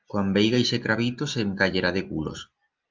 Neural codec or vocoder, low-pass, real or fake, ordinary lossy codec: none; 7.2 kHz; real; Opus, 32 kbps